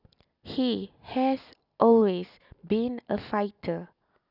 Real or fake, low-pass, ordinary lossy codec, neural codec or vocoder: real; 5.4 kHz; none; none